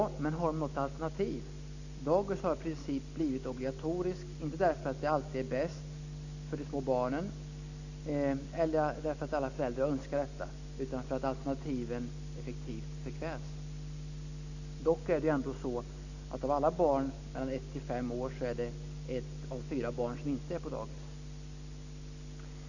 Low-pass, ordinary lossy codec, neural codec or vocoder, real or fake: 7.2 kHz; none; none; real